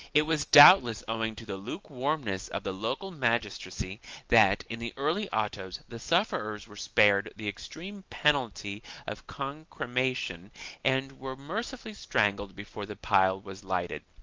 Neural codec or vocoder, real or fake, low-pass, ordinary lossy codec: none; real; 7.2 kHz; Opus, 24 kbps